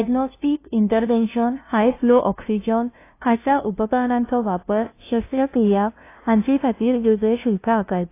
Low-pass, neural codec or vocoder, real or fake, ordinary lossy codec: 3.6 kHz; codec, 16 kHz, 0.5 kbps, FunCodec, trained on LibriTTS, 25 frames a second; fake; AAC, 24 kbps